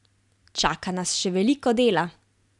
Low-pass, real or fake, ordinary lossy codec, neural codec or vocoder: 10.8 kHz; real; none; none